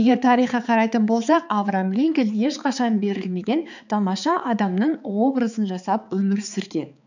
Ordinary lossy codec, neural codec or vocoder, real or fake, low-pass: none; codec, 16 kHz, 4 kbps, X-Codec, HuBERT features, trained on balanced general audio; fake; 7.2 kHz